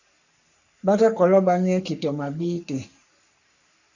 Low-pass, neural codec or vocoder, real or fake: 7.2 kHz; codec, 44.1 kHz, 3.4 kbps, Pupu-Codec; fake